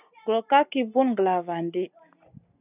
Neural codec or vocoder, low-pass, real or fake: none; 3.6 kHz; real